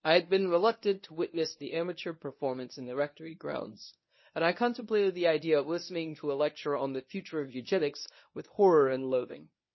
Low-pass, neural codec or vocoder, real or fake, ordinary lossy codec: 7.2 kHz; codec, 24 kHz, 0.9 kbps, WavTokenizer, medium speech release version 1; fake; MP3, 24 kbps